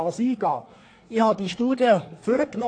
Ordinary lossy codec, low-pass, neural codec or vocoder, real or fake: AAC, 48 kbps; 9.9 kHz; codec, 24 kHz, 1 kbps, SNAC; fake